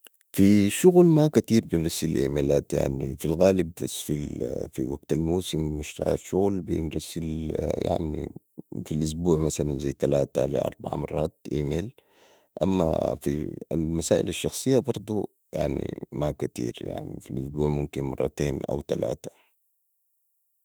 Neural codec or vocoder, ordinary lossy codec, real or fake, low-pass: autoencoder, 48 kHz, 32 numbers a frame, DAC-VAE, trained on Japanese speech; none; fake; none